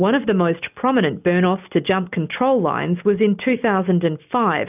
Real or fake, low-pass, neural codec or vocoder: real; 3.6 kHz; none